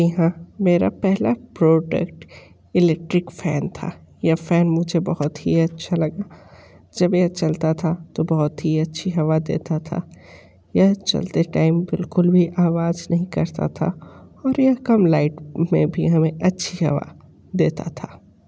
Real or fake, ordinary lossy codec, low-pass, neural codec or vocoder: real; none; none; none